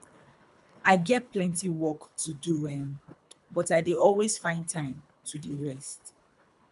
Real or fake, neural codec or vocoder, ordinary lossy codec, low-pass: fake; codec, 24 kHz, 3 kbps, HILCodec; none; 10.8 kHz